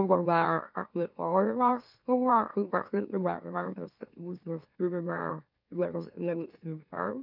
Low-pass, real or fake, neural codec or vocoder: 5.4 kHz; fake; autoencoder, 44.1 kHz, a latent of 192 numbers a frame, MeloTTS